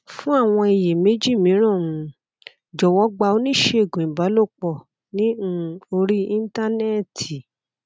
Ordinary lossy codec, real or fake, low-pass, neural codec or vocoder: none; real; none; none